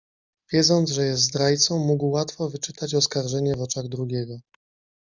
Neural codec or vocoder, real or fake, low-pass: none; real; 7.2 kHz